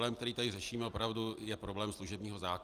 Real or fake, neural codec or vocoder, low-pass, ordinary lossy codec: real; none; 14.4 kHz; Opus, 32 kbps